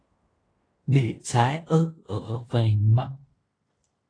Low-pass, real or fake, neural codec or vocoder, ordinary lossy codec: 9.9 kHz; fake; codec, 16 kHz in and 24 kHz out, 0.9 kbps, LongCat-Audio-Codec, fine tuned four codebook decoder; AAC, 32 kbps